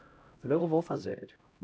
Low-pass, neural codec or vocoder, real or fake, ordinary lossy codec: none; codec, 16 kHz, 0.5 kbps, X-Codec, HuBERT features, trained on LibriSpeech; fake; none